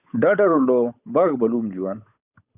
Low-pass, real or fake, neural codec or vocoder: 3.6 kHz; fake; codec, 16 kHz, 8 kbps, FunCodec, trained on Chinese and English, 25 frames a second